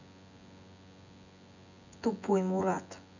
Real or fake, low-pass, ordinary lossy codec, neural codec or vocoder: fake; 7.2 kHz; none; vocoder, 24 kHz, 100 mel bands, Vocos